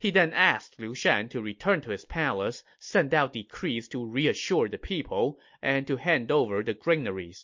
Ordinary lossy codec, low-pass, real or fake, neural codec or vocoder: MP3, 48 kbps; 7.2 kHz; real; none